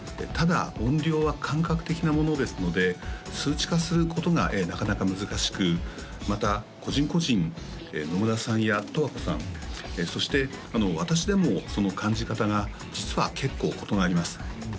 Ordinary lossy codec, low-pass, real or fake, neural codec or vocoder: none; none; real; none